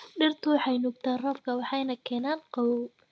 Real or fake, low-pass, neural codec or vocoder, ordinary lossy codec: real; none; none; none